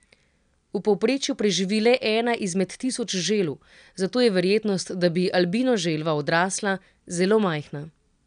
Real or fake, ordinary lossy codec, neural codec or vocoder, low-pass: real; none; none; 9.9 kHz